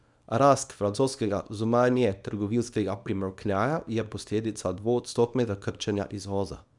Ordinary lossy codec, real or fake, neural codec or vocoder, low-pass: none; fake; codec, 24 kHz, 0.9 kbps, WavTokenizer, small release; 10.8 kHz